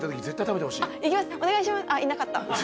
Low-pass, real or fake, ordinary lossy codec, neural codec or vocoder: none; real; none; none